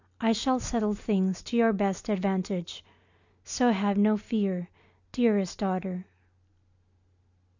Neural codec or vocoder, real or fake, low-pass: none; real; 7.2 kHz